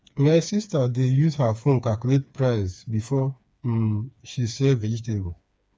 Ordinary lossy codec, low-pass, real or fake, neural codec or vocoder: none; none; fake; codec, 16 kHz, 4 kbps, FreqCodec, smaller model